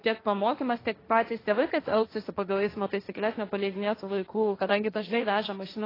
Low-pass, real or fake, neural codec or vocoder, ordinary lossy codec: 5.4 kHz; fake; codec, 16 kHz, 1.1 kbps, Voila-Tokenizer; AAC, 24 kbps